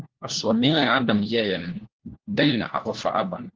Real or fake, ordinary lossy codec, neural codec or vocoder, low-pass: fake; Opus, 16 kbps; codec, 16 kHz, 1 kbps, FunCodec, trained on LibriTTS, 50 frames a second; 7.2 kHz